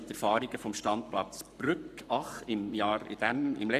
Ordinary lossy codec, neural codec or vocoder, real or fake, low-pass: none; codec, 44.1 kHz, 7.8 kbps, Pupu-Codec; fake; 14.4 kHz